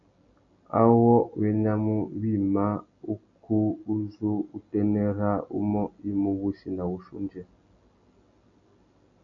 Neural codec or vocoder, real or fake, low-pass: none; real; 7.2 kHz